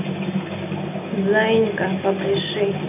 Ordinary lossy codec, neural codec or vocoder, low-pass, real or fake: none; none; 3.6 kHz; real